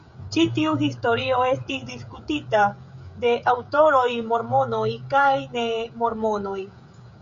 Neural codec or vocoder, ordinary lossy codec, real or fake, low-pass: codec, 16 kHz, 16 kbps, FreqCodec, smaller model; MP3, 48 kbps; fake; 7.2 kHz